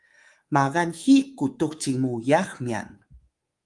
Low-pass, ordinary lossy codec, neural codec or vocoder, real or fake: 10.8 kHz; Opus, 24 kbps; codec, 24 kHz, 3.1 kbps, DualCodec; fake